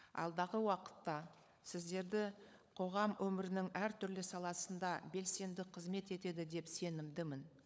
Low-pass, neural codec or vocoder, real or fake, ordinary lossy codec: none; codec, 16 kHz, 16 kbps, FunCodec, trained on LibriTTS, 50 frames a second; fake; none